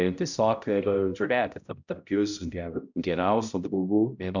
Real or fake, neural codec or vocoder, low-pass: fake; codec, 16 kHz, 0.5 kbps, X-Codec, HuBERT features, trained on balanced general audio; 7.2 kHz